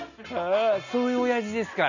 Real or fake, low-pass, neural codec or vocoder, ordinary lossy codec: real; 7.2 kHz; none; none